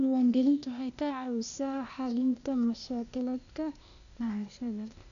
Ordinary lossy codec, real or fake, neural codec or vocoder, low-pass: none; fake; codec, 16 kHz, 0.8 kbps, ZipCodec; 7.2 kHz